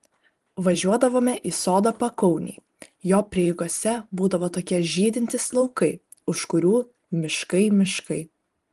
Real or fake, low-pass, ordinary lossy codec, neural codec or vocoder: fake; 14.4 kHz; Opus, 24 kbps; vocoder, 44.1 kHz, 128 mel bands every 512 samples, BigVGAN v2